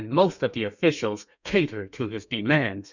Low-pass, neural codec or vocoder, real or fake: 7.2 kHz; codec, 32 kHz, 1.9 kbps, SNAC; fake